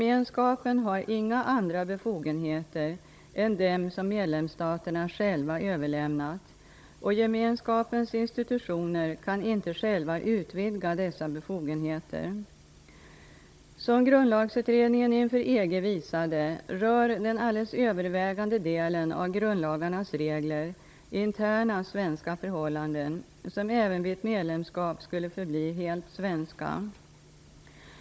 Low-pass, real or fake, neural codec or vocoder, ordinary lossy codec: none; fake; codec, 16 kHz, 16 kbps, FunCodec, trained on Chinese and English, 50 frames a second; none